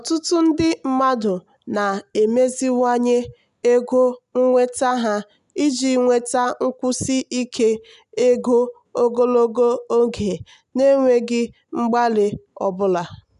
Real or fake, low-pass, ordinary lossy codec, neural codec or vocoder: real; 10.8 kHz; none; none